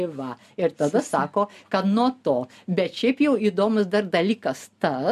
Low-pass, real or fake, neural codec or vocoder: 14.4 kHz; real; none